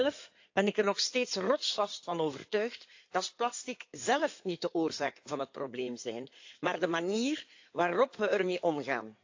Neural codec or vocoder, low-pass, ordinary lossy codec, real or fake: codec, 16 kHz in and 24 kHz out, 2.2 kbps, FireRedTTS-2 codec; 7.2 kHz; AAC, 48 kbps; fake